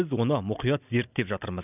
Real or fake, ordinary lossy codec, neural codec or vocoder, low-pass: real; none; none; 3.6 kHz